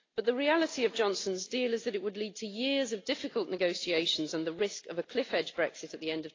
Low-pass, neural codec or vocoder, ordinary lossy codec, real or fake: 7.2 kHz; none; AAC, 32 kbps; real